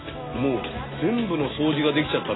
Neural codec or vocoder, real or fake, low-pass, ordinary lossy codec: none; real; 7.2 kHz; AAC, 16 kbps